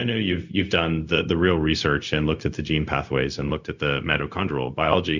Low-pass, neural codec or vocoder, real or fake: 7.2 kHz; codec, 16 kHz, 0.4 kbps, LongCat-Audio-Codec; fake